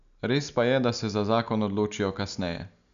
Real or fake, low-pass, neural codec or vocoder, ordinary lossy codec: real; 7.2 kHz; none; none